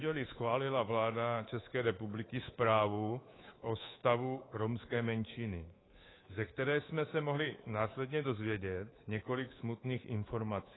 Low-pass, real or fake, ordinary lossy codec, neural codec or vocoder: 7.2 kHz; fake; AAC, 16 kbps; codec, 24 kHz, 3.1 kbps, DualCodec